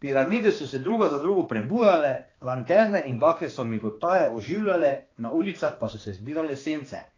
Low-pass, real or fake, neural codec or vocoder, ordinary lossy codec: 7.2 kHz; fake; codec, 16 kHz, 2 kbps, X-Codec, HuBERT features, trained on balanced general audio; AAC, 32 kbps